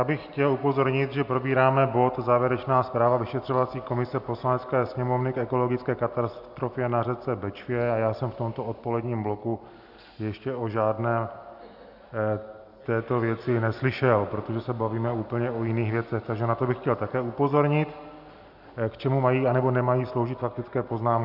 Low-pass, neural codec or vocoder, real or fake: 5.4 kHz; none; real